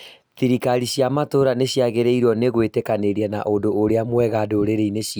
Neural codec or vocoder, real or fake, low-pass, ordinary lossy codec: none; real; none; none